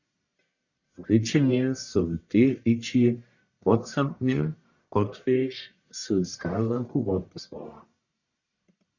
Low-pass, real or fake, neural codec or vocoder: 7.2 kHz; fake; codec, 44.1 kHz, 1.7 kbps, Pupu-Codec